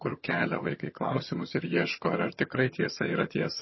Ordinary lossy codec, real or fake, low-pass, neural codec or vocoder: MP3, 24 kbps; fake; 7.2 kHz; vocoder, 22.05 kHz, 80 mel bands, HiFi-GAN